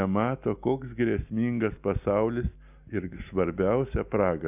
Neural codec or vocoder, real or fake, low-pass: none; real; 3.6 kHz